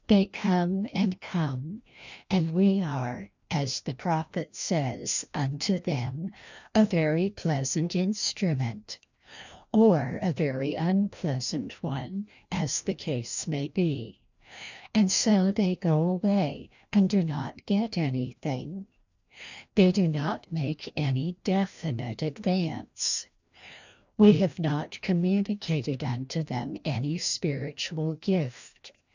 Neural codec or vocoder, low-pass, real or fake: codec, 16 kHz, 1 kbps, FreqCodec, larger model; 7.2 kHz; fake